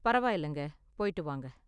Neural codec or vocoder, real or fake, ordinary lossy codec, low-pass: none; real; none; 10.8 kHz